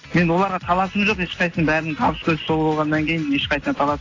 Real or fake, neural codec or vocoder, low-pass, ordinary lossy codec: real; none; 7.2 kHz; AAC, 32 kbps